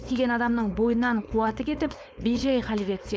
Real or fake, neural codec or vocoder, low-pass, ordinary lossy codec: fake; codec, 16 kHz, 4.8 kbps, FACodec; none; none